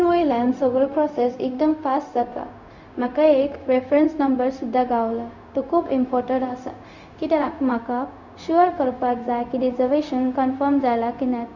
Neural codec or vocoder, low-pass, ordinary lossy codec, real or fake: codec, 16 kHz, 0.4 kbps, LongCat-Audio-Codec; 7.2 kHz; none; fake